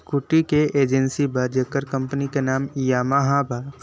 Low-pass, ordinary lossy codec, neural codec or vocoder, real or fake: none; none; none; real